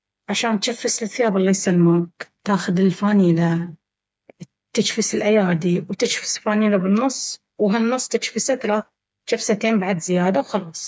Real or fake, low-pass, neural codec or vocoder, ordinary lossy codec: fake; none; codec, 16 kHz, 4 kbps, FreqCodec, smaller model; none